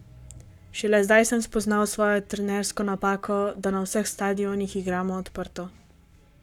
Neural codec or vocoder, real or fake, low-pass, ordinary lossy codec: codec, 44.1 kHz, 7.8 kbps, Pupu-Codec; fake; 19.8 kHz; none